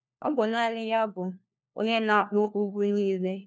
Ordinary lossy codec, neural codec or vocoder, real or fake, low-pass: none; codec, 16 kHz, 1 kbps, FunCodec, trained on LibriTTS, 50 frames a second; fake; none